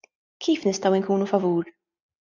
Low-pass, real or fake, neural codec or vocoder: 7.2 kHz; real; none